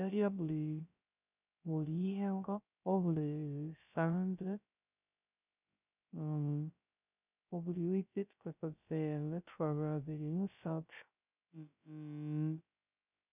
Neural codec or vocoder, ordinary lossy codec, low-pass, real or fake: codec, 16 kHz, 0.3 kbps, FocalCodec; none; 3.6 kHz; fake